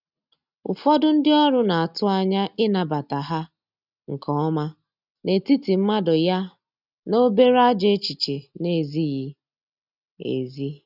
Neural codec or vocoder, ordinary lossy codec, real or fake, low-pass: none; none; real; 5.4 kHz